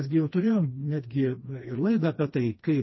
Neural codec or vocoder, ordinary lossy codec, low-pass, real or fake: codec, 16 kHz, 2 kbps, FreqCodec, smaller model; MP3, 24 kbps; 7.2 kHz; fake